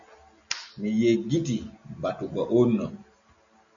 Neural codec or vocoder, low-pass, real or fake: none; 7.2 kHz; real